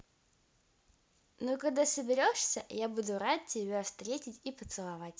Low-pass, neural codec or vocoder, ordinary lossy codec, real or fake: none; none; none; real